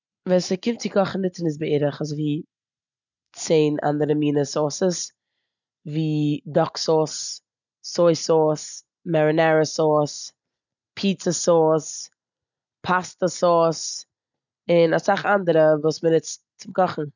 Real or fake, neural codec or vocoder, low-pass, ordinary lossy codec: real; none; 7.2 kHz; none